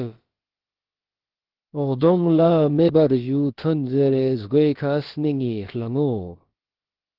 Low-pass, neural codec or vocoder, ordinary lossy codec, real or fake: 5.4 kHz; codec, 16 kHz, about 1 kbps, DyCAST, with the encoder's durations; Opus, 16 kbps; fake